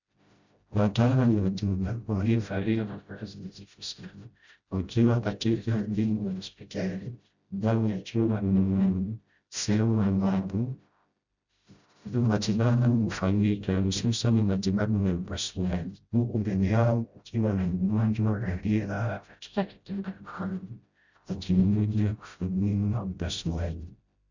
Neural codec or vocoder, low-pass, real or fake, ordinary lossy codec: codec, 16 kHz, 0.5 kbps, FreqCodec, smaller model; 7.2 kHz; fake; Opus, 64 kbps